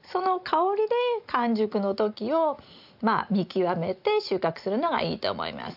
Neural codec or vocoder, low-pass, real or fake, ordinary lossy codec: none; 5.4 kHz; real; AAC, 48 kbps